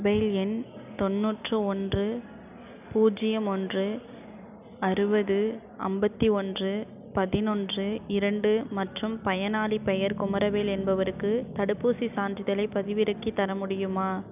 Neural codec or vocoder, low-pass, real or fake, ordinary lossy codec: none; 3.6 kHz; real; none